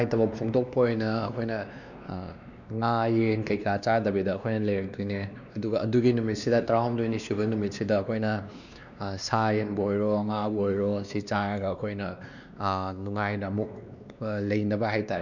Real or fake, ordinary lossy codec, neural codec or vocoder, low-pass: fake; none; codec, 16 kHz, 2 kbps, X-Codec, WavLM features, trained on Multilingual LibriSpeech; 7.2 kHz